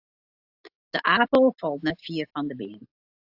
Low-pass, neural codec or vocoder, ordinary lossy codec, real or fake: 5.4 kHz; none; AAC, 48 kbps; real